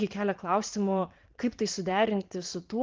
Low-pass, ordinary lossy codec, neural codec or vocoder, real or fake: 7.2 kHz; Opus, 16 kbps; vocoder, 44.1 kHz, 80 mel bands, Vocos; fake